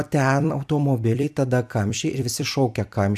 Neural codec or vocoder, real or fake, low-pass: vocoder, 44.1 kHz, 128 mel bands every 256 samples, BigVGAN v2; fake; 14.4 kHz